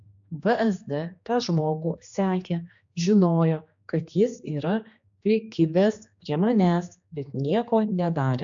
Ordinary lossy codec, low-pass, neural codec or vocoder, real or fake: AAC, 64 kbps; 7.2 kHz; codec, 16 kHz, 2 kbps, X-Codec, HuBERT features, trained on general audio; fake